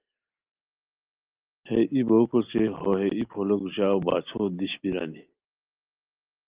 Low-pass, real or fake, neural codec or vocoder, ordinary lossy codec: 3.6 kHz; real; none; Opus, 32 kbps